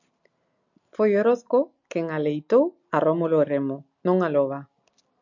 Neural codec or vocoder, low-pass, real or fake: none; 7.2 kHz; real